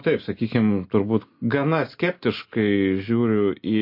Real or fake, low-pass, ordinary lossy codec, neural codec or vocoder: real; 5.4 kHz; MP3, 32 kbps; none